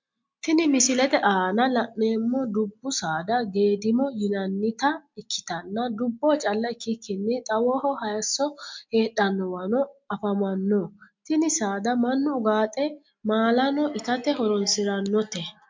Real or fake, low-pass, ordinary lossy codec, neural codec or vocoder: real; 7.2 kHz; MP3, 64 kbps; none